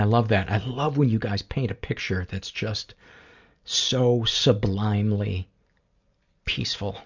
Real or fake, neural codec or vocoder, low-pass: real; none; 7.2 kHz